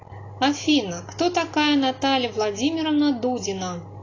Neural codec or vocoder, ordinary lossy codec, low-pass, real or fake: none; AAC, 32 kbps; 7.2 kHz; real